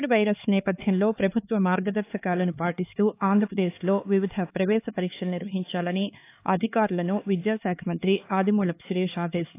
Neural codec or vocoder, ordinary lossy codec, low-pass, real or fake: codec, 16 kHz, 4 kbps, X-Codec, HuBERT features, trained on LibriSpeech; AAC, 24 kbps; 3.6 kHz; fake